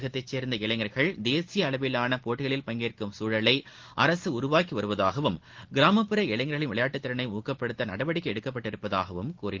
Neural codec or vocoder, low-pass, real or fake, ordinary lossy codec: none; 7.2 kHz; real; Opus, 16 kbps